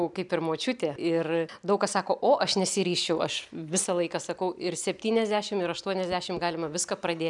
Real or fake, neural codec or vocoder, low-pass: real; none; 10.8 kHz